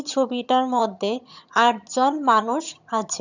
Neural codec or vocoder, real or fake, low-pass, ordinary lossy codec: vocoder, 22.05 kHz, 80 mel bands, HiFi-GAN; fake; 7.2 kHz; none